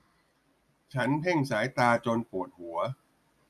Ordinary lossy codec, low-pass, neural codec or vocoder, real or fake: none; 14.4 kHz; none; real